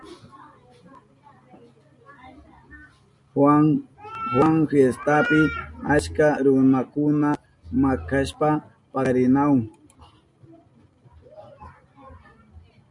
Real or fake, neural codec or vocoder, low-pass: real; none; 10.8 kHz